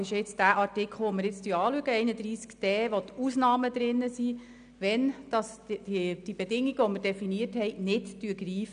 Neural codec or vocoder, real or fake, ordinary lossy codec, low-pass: none; real; none; 9.9 kHz